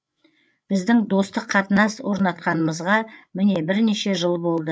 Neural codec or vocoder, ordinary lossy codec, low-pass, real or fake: codec, 16 kHz, 8 kbps, FreqCodec, larger model; none; none; fake